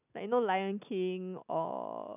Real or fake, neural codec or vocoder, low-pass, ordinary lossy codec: real; none; 3.6 kHz; none